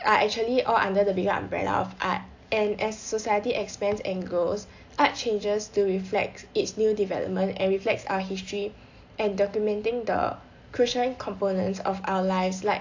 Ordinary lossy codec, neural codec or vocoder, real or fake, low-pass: AAC, 48 kbps; none; real; 7.2 kHz